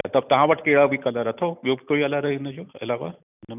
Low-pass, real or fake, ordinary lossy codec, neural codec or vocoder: 3.6 kHz; real; none; none